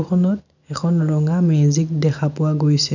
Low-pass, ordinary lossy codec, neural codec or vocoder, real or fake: 7.2 kHz; none; none; real